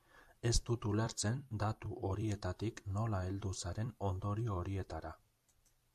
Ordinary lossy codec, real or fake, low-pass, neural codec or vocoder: AAC, 96 kbps; fake; 14.4 kHz; vocoder, 44.1 kHz, 128 mel bands every 256 samples, BigVGAN v2